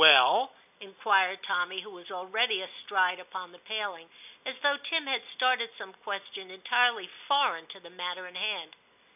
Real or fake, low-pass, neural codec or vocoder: real; 3.6 kHz; none